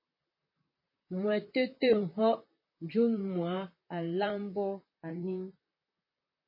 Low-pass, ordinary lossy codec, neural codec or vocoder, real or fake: 5.4 kHz; MP3, 24 kbps; vocoder, 44.1 kHz, 128 mel bands, Pupu-Vocoder; fake